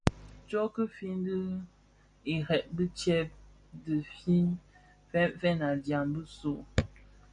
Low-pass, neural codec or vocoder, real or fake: 9.9 kHz; none; real